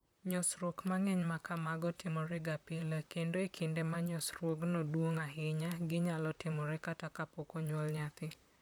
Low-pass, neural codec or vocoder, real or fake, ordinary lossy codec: none; vocoder, 44.1 kHz, 128 mel bands, Pupu-Vocoder; fake; none